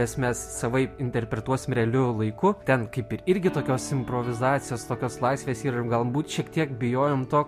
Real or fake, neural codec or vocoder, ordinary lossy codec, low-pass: real; none; MP3, 64 kbps; 14.4 kHz